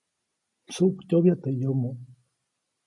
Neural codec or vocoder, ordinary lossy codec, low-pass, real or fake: none; MP3, 48 kbps; 10.8 kHz; real